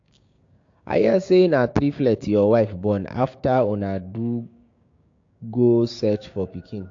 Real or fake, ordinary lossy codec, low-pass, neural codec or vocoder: fake; AAC, 48 kbps; 7.2 kHz; codec, 16 kHz, 6 kbps, DAC